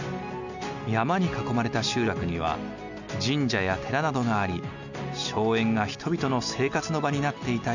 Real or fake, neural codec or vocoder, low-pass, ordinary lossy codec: real; none; 7.2 kHz; none